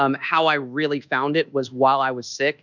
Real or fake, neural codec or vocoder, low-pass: fake; codec, 24 kHz, 1.2 kbps, DualCodec; 7.2 kHz